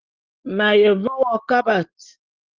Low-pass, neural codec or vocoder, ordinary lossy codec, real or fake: 7.2 kHz; none; Opus, 16 kbps; real